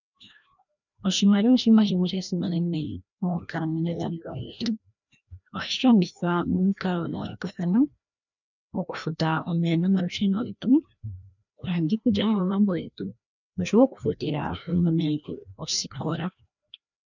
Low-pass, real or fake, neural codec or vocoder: 7.2 kHz; fake; codec, 16 kHz, 1 kbps, FreqCodec, larger model